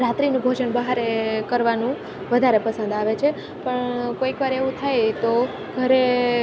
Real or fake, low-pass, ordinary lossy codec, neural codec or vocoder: real; none; none; none